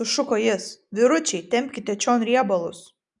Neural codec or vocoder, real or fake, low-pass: none; real; 10.8 kHz